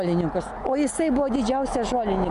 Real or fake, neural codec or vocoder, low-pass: real; none; 10.8 kHz